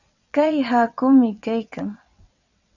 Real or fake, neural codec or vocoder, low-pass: fake; vocoder, 22.05 kHz, 80 mel bands, WaveNeXt; 7.2 kHz